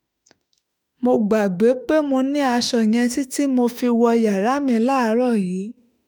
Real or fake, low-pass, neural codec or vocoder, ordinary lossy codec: fake; none; autoencoder, 48 kHz, 32 numbers a frame, DAC-VAE, trained on Japanese speech; none